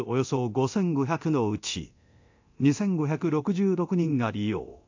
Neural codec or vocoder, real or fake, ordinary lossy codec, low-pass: codec, 24 kHz, 0.9 kbps, DualCodec; fake; AAC, 48 kbps; 7.2 kHz